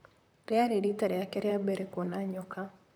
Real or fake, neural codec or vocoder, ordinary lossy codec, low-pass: fake; vocoder, 44.1 kHz, 128 mel bands, Pupu-Vocoder; none; none